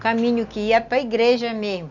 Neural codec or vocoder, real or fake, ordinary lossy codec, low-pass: none; real; none; 7.2 kHz